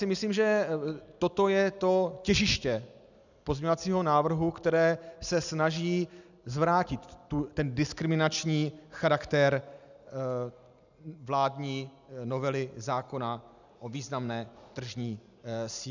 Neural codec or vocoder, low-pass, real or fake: none; 7.2 kHz; real